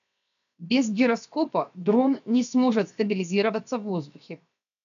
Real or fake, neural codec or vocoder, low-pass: fake; codec, 16 kHz, 0.7 kbps, FocalCodec; 7.2 kHz